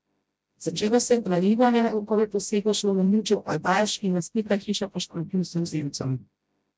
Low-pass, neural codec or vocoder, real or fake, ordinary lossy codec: none; codec, 16 kHz, 0.5 kbps, FreqCodec, smaller model; fake; none